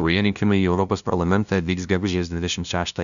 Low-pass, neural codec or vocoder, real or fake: 7.2 kHz; codec, 16 kHz, 0.5 kbps, FunCodec, trained on LibriTTS, 25 frames a second; fake